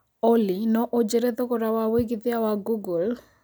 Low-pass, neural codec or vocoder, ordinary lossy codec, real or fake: none; none; none; real